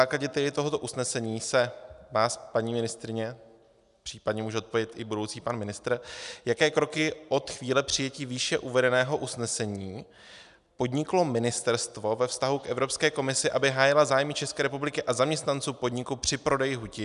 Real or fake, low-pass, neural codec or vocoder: real; 10.8 kHz; none